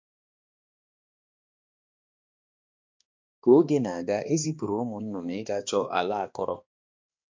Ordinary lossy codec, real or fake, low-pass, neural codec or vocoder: MP3, 48 kbps; fake; 7.2 kHz; codec, 16 kHz, 2 kbps, X-Codec, HuBERT features, trained on balanced general audio